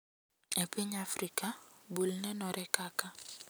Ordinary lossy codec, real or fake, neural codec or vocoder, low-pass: none; real; none; none